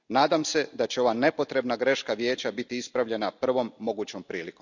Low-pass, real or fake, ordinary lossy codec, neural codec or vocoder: 7.2 kHz; real; none; none